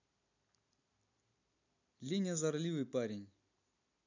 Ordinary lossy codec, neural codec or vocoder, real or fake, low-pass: none; vocoder, 44.1 kHz, 128 mel bands every 256 samples, BigVGAN v2; fake; 7.2 kHz